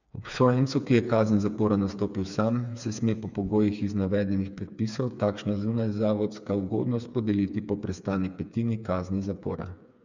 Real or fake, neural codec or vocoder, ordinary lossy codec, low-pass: fake; codec, 16 kHz, 4 kbps, FreqCodec, smaller model; none; 7.2 kHz